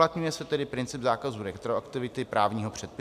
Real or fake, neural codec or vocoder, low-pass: real; none; 14.4 kHz